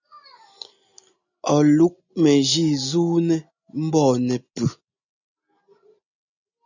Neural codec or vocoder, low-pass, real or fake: none; 7.2 kHz; real